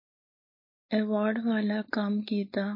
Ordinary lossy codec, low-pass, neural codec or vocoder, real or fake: MP3, 24 kbps; 5.4 kHz; codec, 16 kHz, 4.8 kbps, FACodec; fake